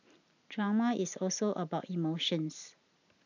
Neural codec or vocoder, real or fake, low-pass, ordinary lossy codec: none; real; 7.2 kHz; none